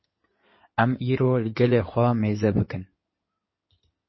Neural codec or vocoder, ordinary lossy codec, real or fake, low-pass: codec, 16 kHz in and 24 kHz out, 2.2 kbps, FireRedTTS-2 codec; MP3, 24 kbps; fake; 7.2 kHz